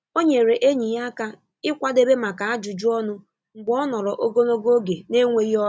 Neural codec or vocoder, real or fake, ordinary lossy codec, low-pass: none; real; none; none